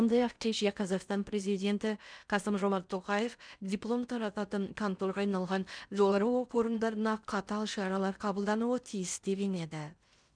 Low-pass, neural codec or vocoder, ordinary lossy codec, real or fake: 9.9 kHz; codec, 16 kHz in and 24 kHz out, 0.6 kbps, FocalCodec, streaming, 4096 codes; none; fake